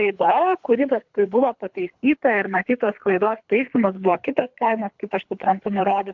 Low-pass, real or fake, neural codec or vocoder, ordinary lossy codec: 7.2 kHz; fake; codec, 24 kHz, 3 kbps, HILCodec; MP3, 64 kbps